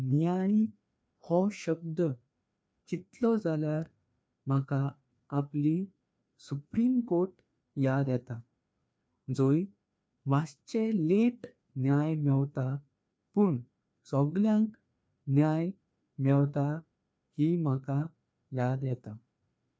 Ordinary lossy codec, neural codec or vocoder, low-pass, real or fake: none; codec, 16 kHz, 2 kbps, FreqCodec, larger model; none; fake